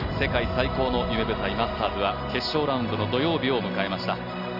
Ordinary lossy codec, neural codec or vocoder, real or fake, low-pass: Opus, 64 kbps; none; real; 5.4 kHz